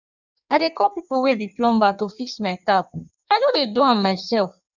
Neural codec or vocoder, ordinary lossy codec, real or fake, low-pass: codec, 16 kHz in and 24 kHz out, 1.1 kbps, FireRedTTS-2 codec; none; fake; 7.2 kHz